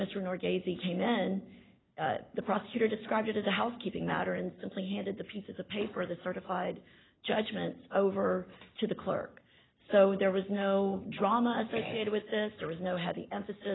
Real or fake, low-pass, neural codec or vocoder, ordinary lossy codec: real; 7.2 kHz; none; AAC, 16 kbps